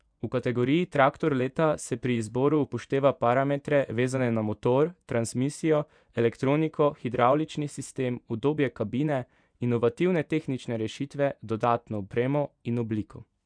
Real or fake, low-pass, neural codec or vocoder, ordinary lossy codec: fake; 9.9 kHz; vocoder, 24 kHz, 100 mel bands, Vocos; none